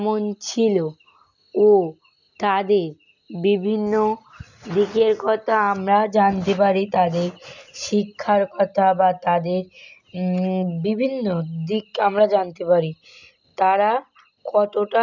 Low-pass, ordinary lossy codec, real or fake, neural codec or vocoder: 7.2 kHz; none; real; none